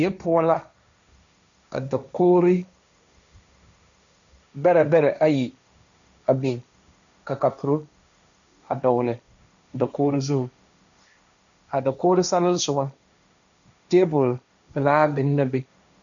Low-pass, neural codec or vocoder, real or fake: 7.2 kHz; codec, 16 kHz, 1.1 kbps, Voila-Tokenizer; fake